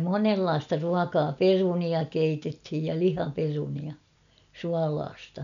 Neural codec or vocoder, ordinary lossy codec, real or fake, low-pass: none; none; real; 7.2 kHz